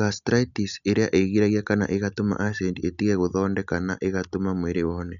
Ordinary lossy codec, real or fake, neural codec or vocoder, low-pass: none; real; none; 7.2 kHz